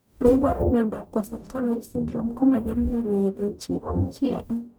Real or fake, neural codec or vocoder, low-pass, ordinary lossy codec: fake; codec, 44.1 kHz, 0.9 kbps, DAC; none; none